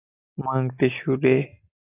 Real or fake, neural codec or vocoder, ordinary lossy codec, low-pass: real; none; AAC, 24 kbps; 3.6 kHz